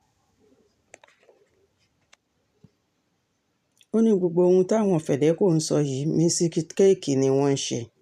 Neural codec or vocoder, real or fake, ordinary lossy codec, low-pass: vocoder, 44.1 kHz, 128 mel bands every 512 samples, BigVGAN v2; fake; none; 14.4 kHz